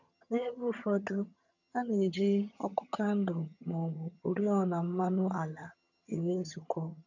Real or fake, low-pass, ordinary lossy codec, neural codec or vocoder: fake; 7.2 kHz; none; vocoder, 22.05 kHz, 80 mel bands, HiFi-GAN